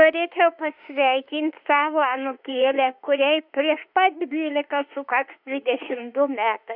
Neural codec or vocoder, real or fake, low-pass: autoencoder, 48 kHz, 32 numbers a frame, DAC-VAE, trained on Japanese speech; fake; 5.4 kHz